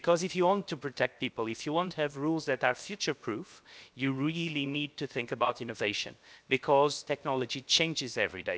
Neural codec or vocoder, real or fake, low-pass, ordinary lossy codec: codec, 16 kHz, 0.7 kbps, FocalCodec; fake; none; none